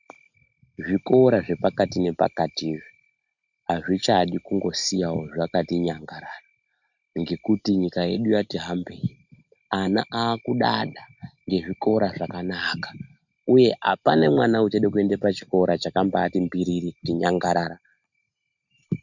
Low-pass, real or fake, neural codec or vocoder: 7.2 kHz; real; none